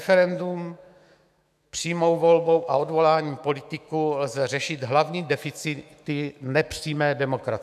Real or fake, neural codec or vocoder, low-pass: fake; autoencoder, 48 kHz, 128 numbers a frame, DAC-VAE, trained on Japanese speech; 14.4 kHz